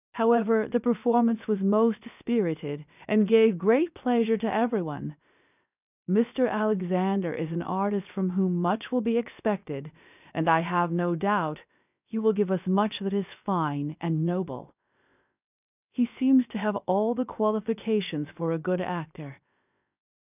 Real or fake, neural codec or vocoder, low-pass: fake; codec, 16 kHz, 0.7 kbps, FocalCodec; 3.6 kHz